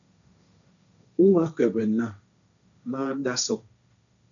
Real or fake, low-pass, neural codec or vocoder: fake; 7.2 kHz; codec, 16 kHz, 1.1 kbps, Voila-Tokenizer